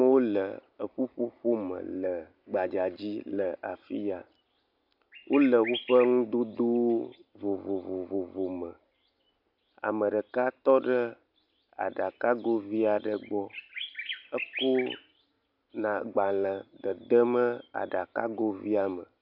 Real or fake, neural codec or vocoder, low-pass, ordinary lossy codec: real; none; 5.4 kHz; AAC, 48 kbps